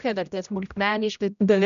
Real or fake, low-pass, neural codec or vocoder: fake; 7.2 kHz; codec, 16 kHz, 0.5 kbps, X-Codec, HuBERT features, trained on general audio